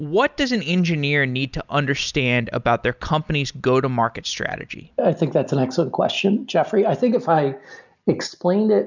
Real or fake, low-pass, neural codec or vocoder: real; 7.2 kHz; none